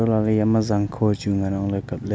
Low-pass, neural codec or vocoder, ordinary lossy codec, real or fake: none; none; none; real